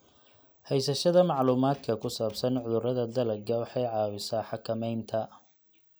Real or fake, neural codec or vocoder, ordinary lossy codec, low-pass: real; none; none; none